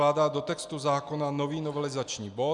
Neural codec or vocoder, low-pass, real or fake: none; 10.8 kHz; real